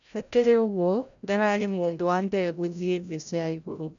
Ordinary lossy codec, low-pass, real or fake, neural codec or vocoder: none; 7.2 kHz; fake; codec, 16 kHz, 0.5 kbps, FreqCodec, larger model